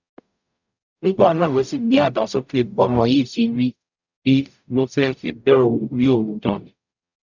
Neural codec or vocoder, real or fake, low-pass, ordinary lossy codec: codec, 44.1 kHz, 0.9 kbps, DAC; fake; 7.2 kHz; none